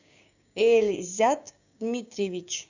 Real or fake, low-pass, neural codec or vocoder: fake; 7.2 kHz; codec, 16 kHz, 6 kbps, DAC